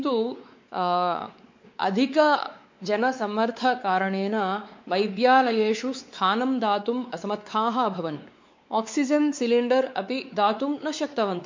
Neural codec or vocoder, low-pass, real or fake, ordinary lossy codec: codec, 16 kHz, 4 kbps, X-Codec, WavLM features, trained on Multilingual LibriSpeech; 7.2 kHz; fake; MP3, 48 kbps